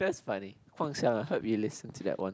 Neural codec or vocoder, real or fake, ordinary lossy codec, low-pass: none; real; none; none